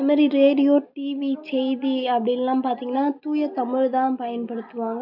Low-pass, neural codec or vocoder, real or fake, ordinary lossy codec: 5.4 kHz; none; real; none